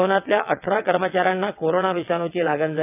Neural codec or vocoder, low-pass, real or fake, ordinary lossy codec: vocoder, 22.05 kHz, 80 mel bands, WaveNeXt; 3.6 kHz; fake; none